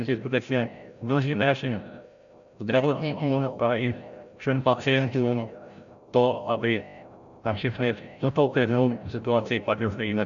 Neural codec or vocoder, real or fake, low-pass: codec, 16 kHz, 0.5 kbps, FreqCodec, larger model; fake; 7.2 kHz